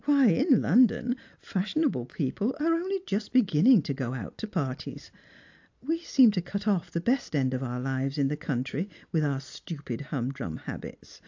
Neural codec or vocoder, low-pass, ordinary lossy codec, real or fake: none; 7.2 kHz; AAC, 48 kbps; real